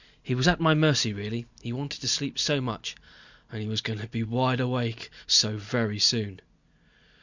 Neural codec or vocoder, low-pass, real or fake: none; 7.2 kHz; real